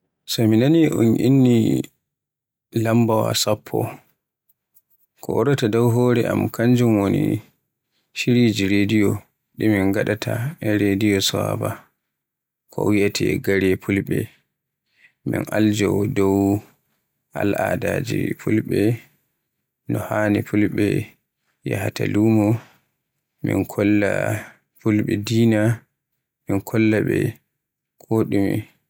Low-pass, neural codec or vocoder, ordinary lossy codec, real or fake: 19.8 kHz; none; none; real